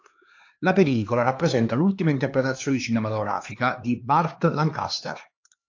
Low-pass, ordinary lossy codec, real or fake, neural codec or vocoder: 7.2 kHz; AAC, 48 kbps; fake; codec, 16 kHz, 2 kbps, X-Codec, HuBERT features, trained on LibriSpeech